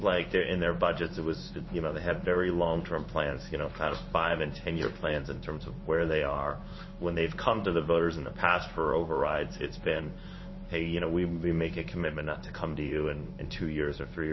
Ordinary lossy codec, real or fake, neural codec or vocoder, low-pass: MP3, 24 kbps; fake; codec, 16 kHz in and 24 kHz out, 1 kbps, XY-Tokenizer; 7.2 kHz